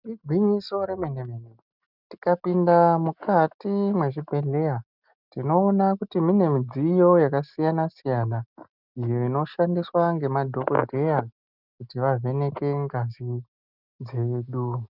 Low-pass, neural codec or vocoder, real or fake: 5.4 kHz; none; real